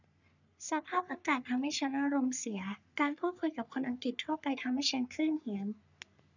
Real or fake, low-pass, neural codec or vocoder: fake; 7.2 kHz; codec, 44.1 kHz, 3.4 kbps, Pupu-Codec